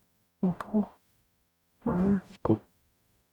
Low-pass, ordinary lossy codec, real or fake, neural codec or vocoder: 19.8 kHz; none; fake; codec, 44.1 kHz, 0.9 kbps, DAC